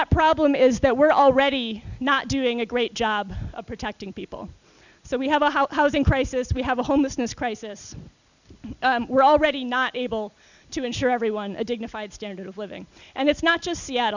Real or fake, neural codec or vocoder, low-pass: real; none; 7.2 kHz